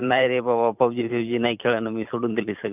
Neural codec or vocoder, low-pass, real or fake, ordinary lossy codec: vocoder, 44.1 kHz, 128 mel bands every 512 samples, BigVGAN v2; 3.6 kHz; fake; none